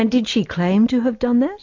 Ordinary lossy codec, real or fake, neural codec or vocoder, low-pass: MP3, 48 kbps; real; none; 7.2 kHz